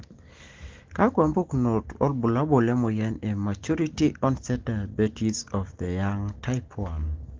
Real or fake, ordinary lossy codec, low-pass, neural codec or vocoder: real; Opus, 16 kbps; 7.2 kHz; none